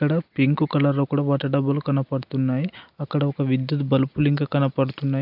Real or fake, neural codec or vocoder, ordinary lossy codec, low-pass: real; none; AAC, 48 kbps; 5.4 kHz